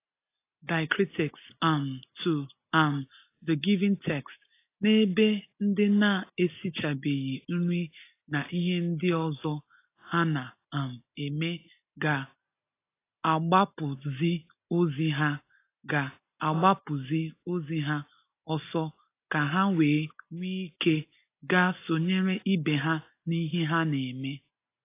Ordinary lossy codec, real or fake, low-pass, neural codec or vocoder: AAC, 24 kbps; real; 3.6 kHz; none